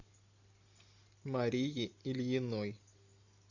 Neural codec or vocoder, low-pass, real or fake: none; 7.2 kHz; real